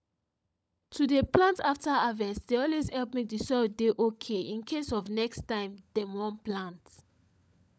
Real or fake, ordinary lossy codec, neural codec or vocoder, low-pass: fake; none; codec, 16 kHz, 16 kbps, FunCodec, trained on LibriTTS, 50 frames a second; none